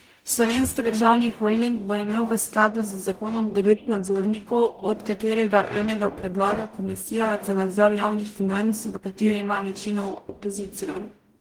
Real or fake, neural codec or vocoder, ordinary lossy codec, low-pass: fake; codec, 44.1 kHz, 0.9 kbps, DAC; Opus, 24 kbps; 19.8 kHz